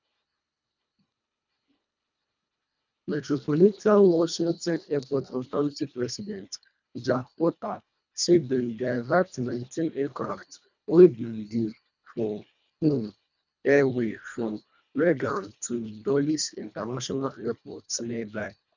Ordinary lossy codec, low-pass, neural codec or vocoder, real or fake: none; 7.2 kHz; codec, 24 kHz, 1.5 kbps, HILCodec; fake